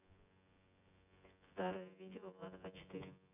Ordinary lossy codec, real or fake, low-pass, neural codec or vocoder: none; fake; 3.6 kHz; vocoder, 24 kHz, 100 mel bands, Vocos